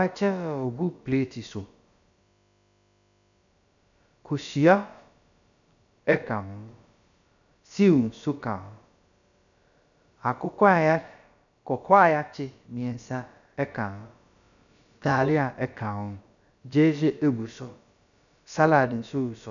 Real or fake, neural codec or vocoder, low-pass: fake; codec, 16 kHz, about 1 kbps, DyCAST, with the encoder's durations; 7.2 kHz